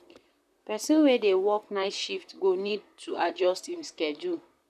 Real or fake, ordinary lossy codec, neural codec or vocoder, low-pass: fake; none; vocoder, 44.1 kHz, 128 mel bands, Pupu-Vocoder; 14.4 kHz